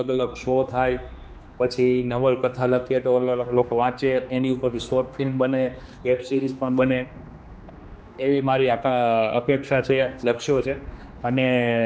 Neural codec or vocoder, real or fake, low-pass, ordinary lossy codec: codec, 16 kHz, 2 kbps, X-Codec, HuBERT features, trained on general audio; fake; none; none